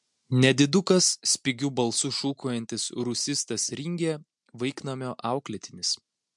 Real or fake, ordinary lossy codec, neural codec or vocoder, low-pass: real; MP3, 64 kbps; none; 10.8 kHz